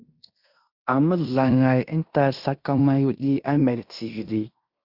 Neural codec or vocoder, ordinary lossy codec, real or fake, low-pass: codec, 16 kHz in and 24 kHz out, 0.9 kbps, LongCat-Audio-Codec, fine tuned four codebook decoder; Opus, 64 kbps; fake; 5.4 kHz